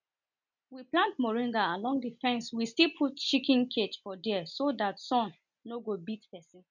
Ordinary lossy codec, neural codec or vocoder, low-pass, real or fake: none; none; 7.2 kHz; real